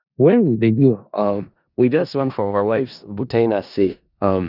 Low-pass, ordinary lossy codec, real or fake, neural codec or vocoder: 5.4 kHz; none; fake; codec, 16 kHz in and 24 kHz out, 0.4 kbps, LongCat-Audio-Codec, four codebook decoder